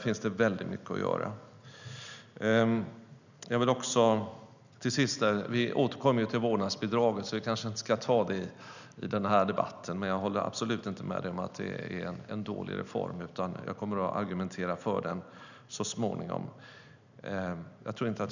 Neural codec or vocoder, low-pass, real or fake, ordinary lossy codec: none; 7.2 kHz; real; none